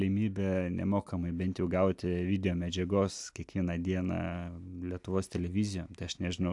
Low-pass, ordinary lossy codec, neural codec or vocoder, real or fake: 10.8 kHz; AAC, 64 kbps; none; real